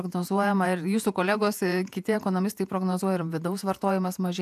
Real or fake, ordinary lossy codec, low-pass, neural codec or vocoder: fake; AAC, 96 kbps; 14.4 kHz; vocoder, 48 kHz, 128 mel bands, Vocos